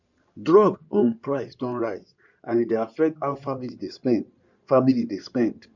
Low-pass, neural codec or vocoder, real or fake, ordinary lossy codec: 7.2 kHz; codec, 16 kHz in and 24 kHz out, 2.2 kbps, FireRedTTS-2 codec; fake; none